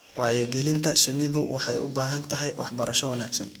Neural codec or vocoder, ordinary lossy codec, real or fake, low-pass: codec, 44.1 kHz, 2.6 kbps, DAC; none; fake; none